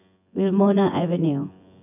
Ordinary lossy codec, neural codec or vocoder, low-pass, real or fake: none; vocoder, 24 kHz, 100 mel bands, Vocos; 3.6 kHz; fake